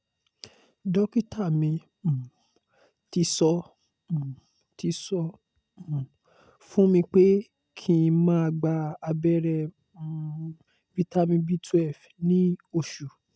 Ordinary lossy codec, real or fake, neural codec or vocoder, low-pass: none; real; none; none